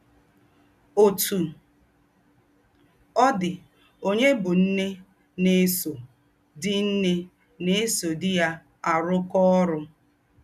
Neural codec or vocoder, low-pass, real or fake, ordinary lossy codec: none; 14.4 kHz; real; none